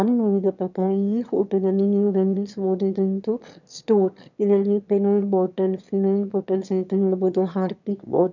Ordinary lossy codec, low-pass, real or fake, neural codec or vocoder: none; 7.2 kHz; fake; autoencoder, 22.05 kHz, a latent of 192 numbers a frame, VITS, trained on one speaker